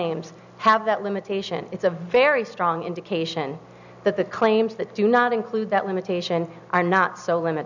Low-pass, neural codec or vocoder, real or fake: 7.2 kHz; none; real